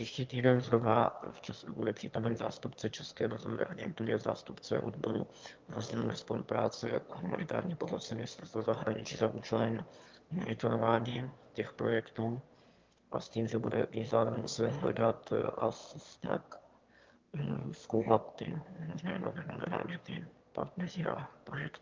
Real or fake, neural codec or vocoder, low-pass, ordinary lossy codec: fake; autoencoder, 22.05 kHz, a latent of 192 numbers a frame, VITS, trained on one speaker; 7.2 kHz; Opus, 32 kbps